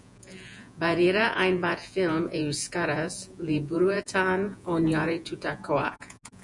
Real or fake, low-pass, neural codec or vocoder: fake; 10.8 kHz; vocoder, 48 kHz, 128 mel bands, Vocos